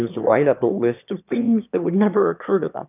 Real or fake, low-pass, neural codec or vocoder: fake; 3.6 kHz; autoencoder, 22.05 kHz, a latent of 192 numbers a frame, VITS, trained on one speaker